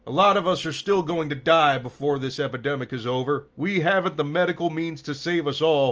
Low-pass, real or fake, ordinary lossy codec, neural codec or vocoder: 7.2 kHz; real; Opus, 24 kbps; none